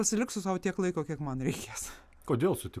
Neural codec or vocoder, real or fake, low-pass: none; real; 14.4 kHz